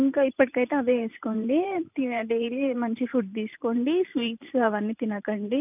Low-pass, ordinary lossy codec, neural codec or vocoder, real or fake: 3.6 kHz; none; vocoder, 44.1 kHz, 128 mel bands every 512 samples, BigVGAN v2; fake